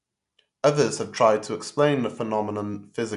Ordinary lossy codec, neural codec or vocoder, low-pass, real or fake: none; none; 10.8 kHz; real